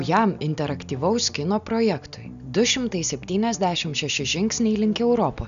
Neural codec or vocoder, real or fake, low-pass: none; real; 7.2 kHz